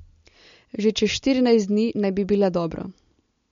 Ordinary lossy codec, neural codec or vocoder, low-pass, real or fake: MP3, 48 kbps; none; 7.2 kHz; real